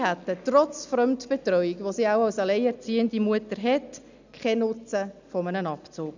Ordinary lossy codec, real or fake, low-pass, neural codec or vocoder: none; real; 7.2 kHz; none